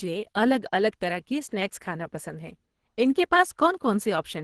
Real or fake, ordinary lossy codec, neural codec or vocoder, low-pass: fake; Opus, 16 kbps; codec, 24 kHz, 3 kbps, HILCodec; 10.8 kHz